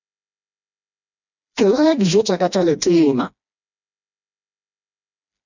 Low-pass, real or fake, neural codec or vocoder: 7.2 kHz; fake; codec, 16 kHz, 1 kbps, FreqCodec, smaller model